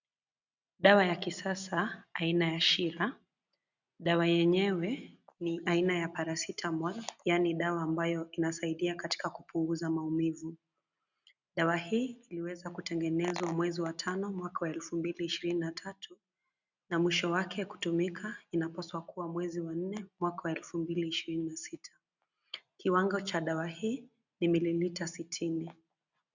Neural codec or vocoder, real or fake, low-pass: none; real; 7.2 kHz